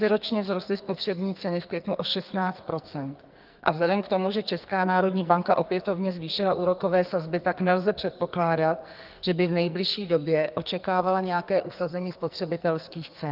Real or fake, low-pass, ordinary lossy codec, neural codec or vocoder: fake; 5.4 kHz; Opus, 24 kbps; codec, 44.1 kHz, 2.6 kbps, SNAC